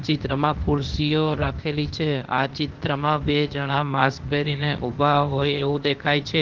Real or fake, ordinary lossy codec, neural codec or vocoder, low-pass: fake; Opus, 16 kbps; codec, 16 kHz, 0.8 kbps, ZipCodec; 7.2 kHz